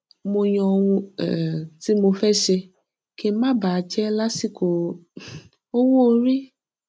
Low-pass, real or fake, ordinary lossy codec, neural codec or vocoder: none; real; none; none